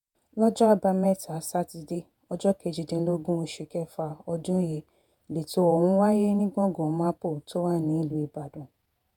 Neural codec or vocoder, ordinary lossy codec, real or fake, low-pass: vocoder, 48 kHz, 128 mel bands, Vocos; none; fake; 19.8 kHz